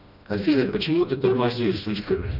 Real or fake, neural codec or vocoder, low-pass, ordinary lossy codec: fake; codec, 16 kHz, 1 kbps, FreqCodec, smaller model; 5.4 kHz; none